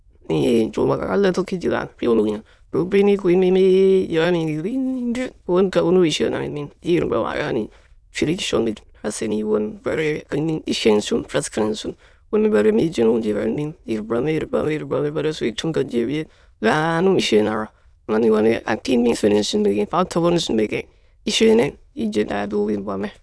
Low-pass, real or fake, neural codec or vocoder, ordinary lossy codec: none; fake; autoencoder, 22.05 kHz, a latent of 192 numbers a frame, VITS, trained on many speakers; none